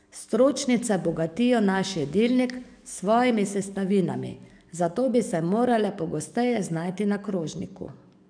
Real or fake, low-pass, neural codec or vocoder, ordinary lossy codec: fake; 9.9 kHz; codec, 44.1 kHz, 7.8 kbps, DAC; none